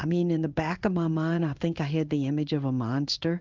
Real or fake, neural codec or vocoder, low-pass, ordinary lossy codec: fake; codec, 16 kHz in and 24 kHz out, 1 kbps, XY-Tokenizer; 7.2 kHz; Opus, 24 kbps